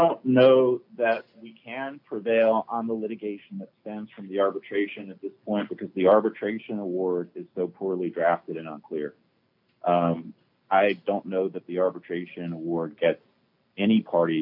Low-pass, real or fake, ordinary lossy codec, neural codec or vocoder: 5.4 kHz; real; MP3, 48 kbps; none